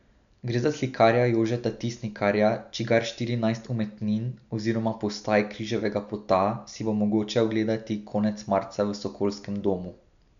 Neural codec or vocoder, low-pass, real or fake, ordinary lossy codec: none; 7.2 kHz; real; none